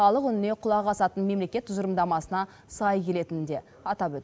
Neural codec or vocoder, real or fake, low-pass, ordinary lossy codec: none; real; none; none